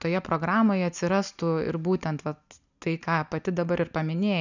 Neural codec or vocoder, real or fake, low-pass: none; real; 7.2 kHz